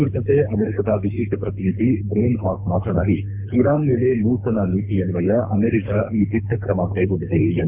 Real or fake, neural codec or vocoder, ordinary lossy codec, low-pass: fake; codec, 24 kHz, 3 kbps, HILCodec; MP3, 32 kbps; 3.6 kHz